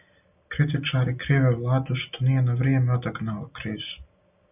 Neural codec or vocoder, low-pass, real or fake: none; 3.6 kHz; real